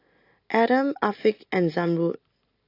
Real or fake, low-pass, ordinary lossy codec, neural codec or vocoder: real; 5.4 kHz; AAC, 32 kbps; none